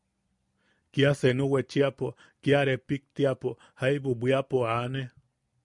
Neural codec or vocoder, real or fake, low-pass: none; real; 10.8 kHz